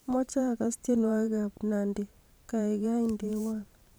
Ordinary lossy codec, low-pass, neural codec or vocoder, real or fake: none; none; vocoder, 44.1 kHz, 128 mel bands every 512 samples, BigVGAN v2; fake